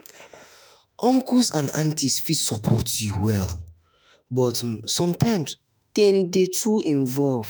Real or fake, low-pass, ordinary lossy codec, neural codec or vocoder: fake; none; none; autoencoder, 48 kHz, 32 numbers a frame, DAC-VAE, trained on Japanese speech